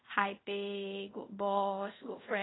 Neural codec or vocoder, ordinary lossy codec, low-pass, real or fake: codec, 24 kHz, 0.5 kbps, DualCodec; AAC, 16 kbps; 7.2 kHz; fake